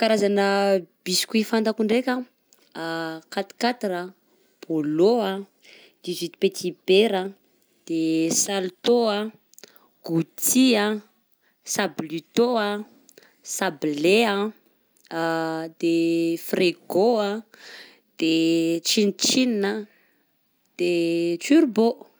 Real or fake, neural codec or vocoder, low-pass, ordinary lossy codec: real; none; none; none